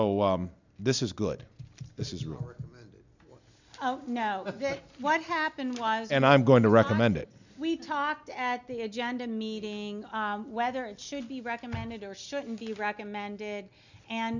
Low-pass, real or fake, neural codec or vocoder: 7.2 kHz; real; none